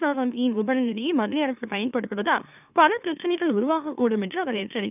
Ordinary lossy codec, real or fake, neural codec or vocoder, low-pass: none; fake; autoencoder, 44.1 kHz, a latent of 192 numbers a frame, MeloTTS; 3.6 kHz